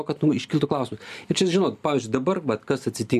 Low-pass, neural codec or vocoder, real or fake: 14.4 kHz; none; real